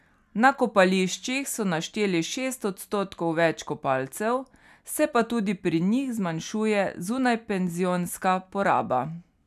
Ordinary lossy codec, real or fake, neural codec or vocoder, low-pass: none; real; none; 14.4 kHz